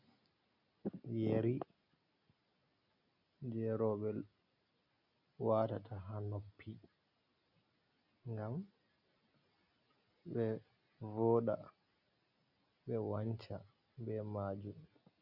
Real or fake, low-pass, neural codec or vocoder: real; 5.4 kHz; none